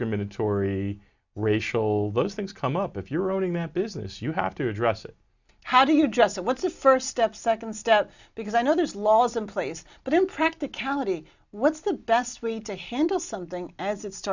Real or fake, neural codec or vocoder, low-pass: real; none; 7.2 kHz